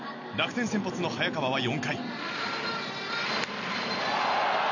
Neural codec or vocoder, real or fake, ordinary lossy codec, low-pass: none; real; none; 7.2 kHz